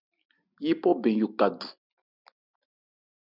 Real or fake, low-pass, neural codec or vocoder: real; 5.4 kHz; none